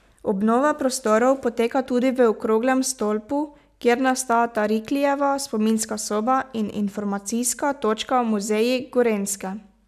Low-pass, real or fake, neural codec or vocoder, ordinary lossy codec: 14.4 kHz; real; none; none